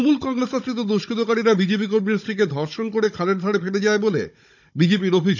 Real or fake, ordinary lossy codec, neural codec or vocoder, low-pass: fake; none; codec, 16 kHz, 16 kbps, FunCodec, trained on Chinese and English, 50 frames a second; 7.2 kHz